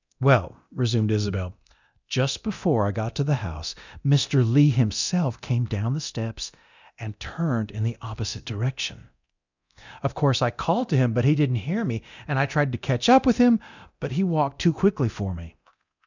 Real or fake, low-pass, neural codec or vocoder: fake; 7.2 kHz; codec, 24 kHz, 0.9 kbps, DualCodec